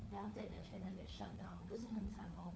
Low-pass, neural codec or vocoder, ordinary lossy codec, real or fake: none; codec, 16 kHz, 4 kbps, FunCodec, trained on LibriTTS, 50 frames a second; none; fake